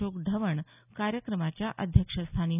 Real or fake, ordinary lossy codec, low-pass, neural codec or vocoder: real; none; 3.6 kHz; none